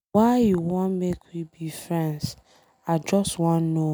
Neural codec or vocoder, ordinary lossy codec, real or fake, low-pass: none; none; real; none